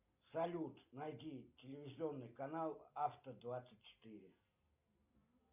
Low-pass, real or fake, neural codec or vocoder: 3.6 kHz; real; none